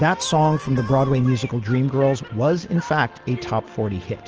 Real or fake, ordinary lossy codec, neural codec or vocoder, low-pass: real; Opus, 16 kbps; none; 7.2 kHz